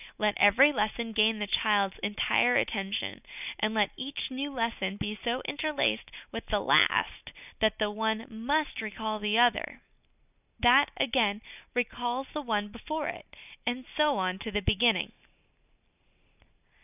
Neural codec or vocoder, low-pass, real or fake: none; 3.6 kHz; real